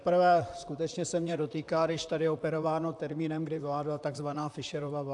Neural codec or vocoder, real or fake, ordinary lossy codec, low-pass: vocoder, 44.1 kHz, 128 mel bands, Pupu-Vocoder; fake; AAC, 64 kbps; 10.8 kHz